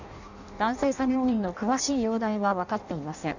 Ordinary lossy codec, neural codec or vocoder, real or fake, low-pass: none; codec, 16 kHz in and 24 kHz out, 0.6 kbps, FireRedTTS-2 codec; fake; 7.2 kHz